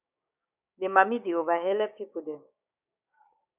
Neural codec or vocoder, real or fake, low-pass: codec, 16 kHz, 6 kbps, DAC; fake; 3.6 kHz